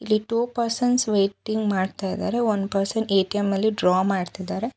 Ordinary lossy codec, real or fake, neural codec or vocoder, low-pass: none; real; none; none